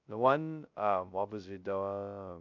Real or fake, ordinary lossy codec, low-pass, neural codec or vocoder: fake; none; 7.2 kHz; codec, 16 kHz, 0.2 kbps, FocalCodec